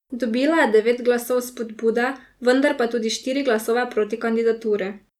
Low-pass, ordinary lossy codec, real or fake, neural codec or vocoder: 19.8 kHz; none; real; none